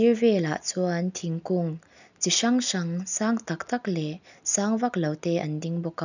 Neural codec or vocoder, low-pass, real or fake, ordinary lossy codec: none; 7.2 kHz; real; none